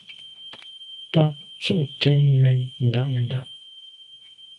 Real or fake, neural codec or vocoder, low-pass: fake; codec, 24 kHz, 0.9 kbps, WavTokenizer, medium music audio release; 10.8 kHz